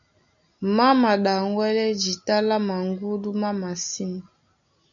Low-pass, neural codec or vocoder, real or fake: 7.2 kHz; none; real